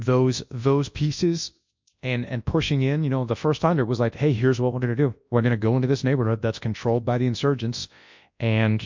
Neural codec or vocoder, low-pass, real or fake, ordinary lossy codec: codec, 24 kHz, 0.9 kbps, WavTokenizer, large speech release; 7.2 kHz; fake; MP3, 64 kbps